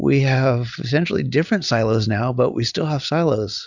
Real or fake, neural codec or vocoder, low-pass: real; none; 7.2 kHz